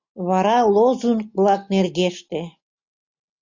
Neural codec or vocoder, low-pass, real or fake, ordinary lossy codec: none; 7.2 kHz; real; MP3, 64 kbps